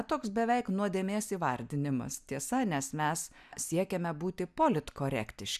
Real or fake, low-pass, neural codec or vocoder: real; 14.4 kHz; none